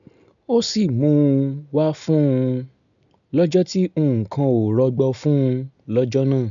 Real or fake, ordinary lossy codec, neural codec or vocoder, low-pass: real; none; none; 7.2 kHz